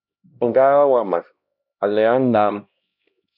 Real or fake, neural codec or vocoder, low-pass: fake; codec, 16 kHz, 1 kbps, X-Codec, HuBERT features, trained on LibriSpeech; 5.4 kHz